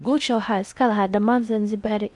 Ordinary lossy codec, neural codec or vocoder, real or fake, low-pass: none; codec, 16 kHz in and 24 kHz out, 0.6 kbps, FocalCodec, streaming, 4096 codes; fake; 10.8 kHz